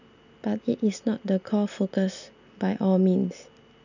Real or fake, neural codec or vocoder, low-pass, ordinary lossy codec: real; none; 7.2 kHz; none